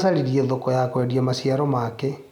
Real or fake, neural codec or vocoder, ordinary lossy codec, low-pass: real; none; none; 19.8 kHz